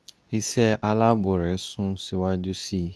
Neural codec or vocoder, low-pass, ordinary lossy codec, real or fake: codec, 24 kHz, 0.9 kbps, WavTokenizer, medium speech release version 2; none; none; fake